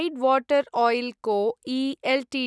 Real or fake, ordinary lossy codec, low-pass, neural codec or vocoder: real; none; none; none